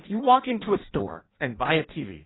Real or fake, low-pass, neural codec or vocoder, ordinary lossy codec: fake; 7.2 kHz; codec, 16 kHz in and 24 kHz out, 0.6 kbps, FireRedTTS-2 codec; AAC, 16 kbps